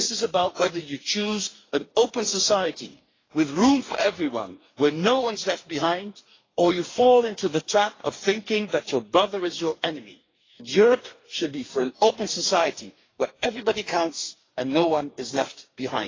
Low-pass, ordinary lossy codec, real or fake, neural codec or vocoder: 7.2 kHz; AAC, 32 kbps; fake; codec, 44.1 kHz, 2.6 kbps, DAC